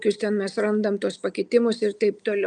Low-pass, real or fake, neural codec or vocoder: 10.8 kHz; real; none